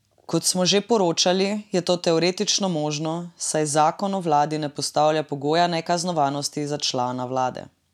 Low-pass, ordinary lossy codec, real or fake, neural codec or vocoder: 19.8 kHz; none; real; none